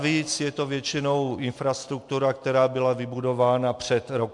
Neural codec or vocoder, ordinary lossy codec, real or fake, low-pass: none; MP3, 64 kbps; real; 10.8 kHz